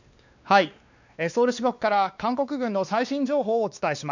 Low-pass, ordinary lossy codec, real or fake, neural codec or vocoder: 7.2 kHz; none; fake; codec, 16 kHz, 2 kbps, X-Codec, WavLM features, trained on Multilingual LibriSpeech